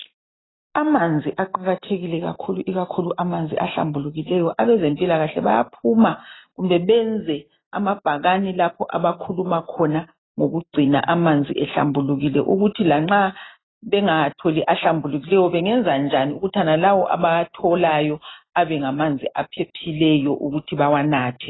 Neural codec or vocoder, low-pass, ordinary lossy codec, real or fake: none; 7.2 kHz; AAC, 16 kbps; real